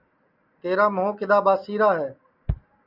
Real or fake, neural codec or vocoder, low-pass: real; none; 5.4 kHz